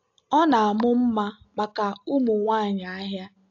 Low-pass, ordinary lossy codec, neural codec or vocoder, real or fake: 7.2 kHz; AAC, 48 kbps; none; real